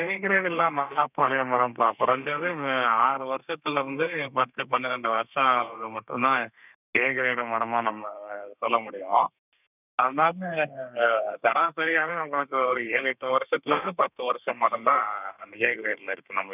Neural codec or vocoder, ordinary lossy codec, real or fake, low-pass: codec, 32 kHz, 1.9 kbps, SNAC; none; fake; 3.6 kHz